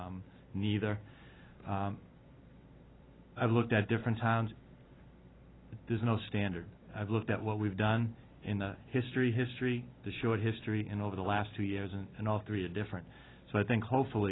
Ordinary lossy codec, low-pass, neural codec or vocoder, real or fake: AAC, 16 kbps; 7.2 kHz; none; real